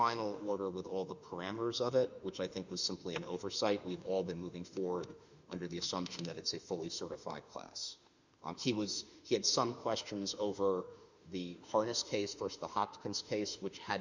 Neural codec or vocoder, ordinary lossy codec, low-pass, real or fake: autoencoder, 48 kHz, 32 numbers a frame, DAC-VAE, trained on Japanese speech; Opus, 64 kbps; 7.2 kHz; fake